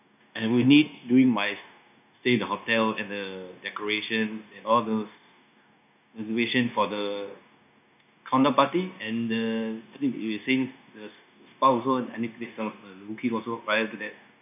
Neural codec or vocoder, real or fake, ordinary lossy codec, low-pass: codec, 16 kHz, 0.9 kbps, LongCat-Audio-Codec; fake; none; 3.6 kHz